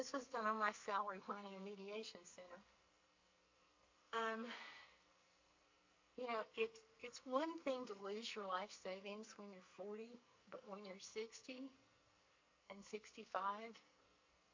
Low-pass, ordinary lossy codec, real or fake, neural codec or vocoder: 7.2 kHz; MP3, 48 kbps; fake; codec, 44.1 kHz, 2.6 kbps, SNAC